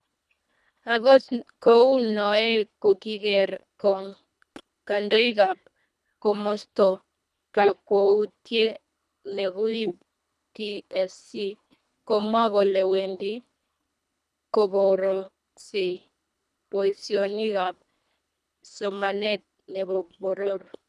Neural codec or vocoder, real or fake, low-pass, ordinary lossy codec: codec, 24 kHz, 1.5 kbps, HILCodec; fake; none; none